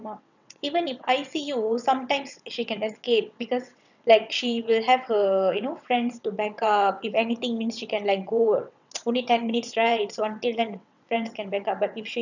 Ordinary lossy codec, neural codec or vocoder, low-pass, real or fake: none; vocoder, 22.05 kHz, 80 mel bands, WaveNeXt; 7.2 kHz; fake